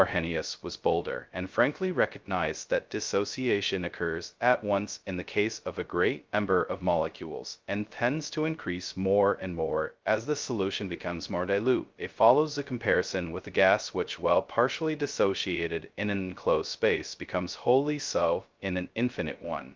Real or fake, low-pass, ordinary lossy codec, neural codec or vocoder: fake; 7.2 kHz; Opus, 24 kbps; codec, 16 kHz, 0.2 kbps, FocalCodec